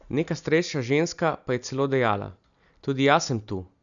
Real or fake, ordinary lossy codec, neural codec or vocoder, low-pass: real; none; none; 7.2 kHz